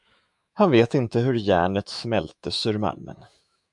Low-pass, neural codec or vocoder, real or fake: 10.8 kHz; autoencoder, 48 kHz, 128 numbers a frame, DAC-VAE, trained on Japanese speech; fake